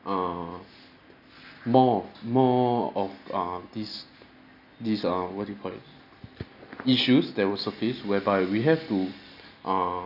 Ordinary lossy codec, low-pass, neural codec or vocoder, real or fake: none; 5.4 kHz; none; real